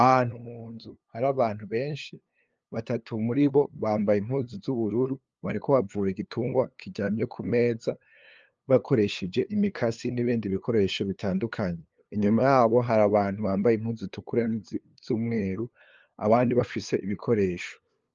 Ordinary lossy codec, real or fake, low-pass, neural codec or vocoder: Opus, 24 kbps; fake; 7.2 kHz; codec, 16 kHz, 2 kbps, FunCodec, trained on LibriTTS, 25 frames a second